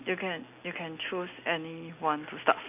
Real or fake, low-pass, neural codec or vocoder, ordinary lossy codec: real; 3.6 kHz; none; none